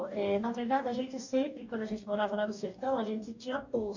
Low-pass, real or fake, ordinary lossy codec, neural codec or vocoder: 7.2 kHz; fake; none; codec, 44.1 kHz, 2.6 kbps, DAC